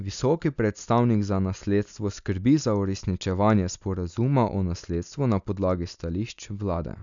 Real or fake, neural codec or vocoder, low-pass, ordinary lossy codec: real; none; 7.2 kHz; none